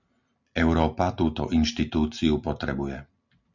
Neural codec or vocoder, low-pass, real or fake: none; 7.2 kHz; real